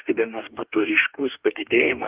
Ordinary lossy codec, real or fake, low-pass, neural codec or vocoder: Opus, 24 kbps; fake; 3.6 kHz; codec, 44.1 kHz, 2.6 kbps, DAC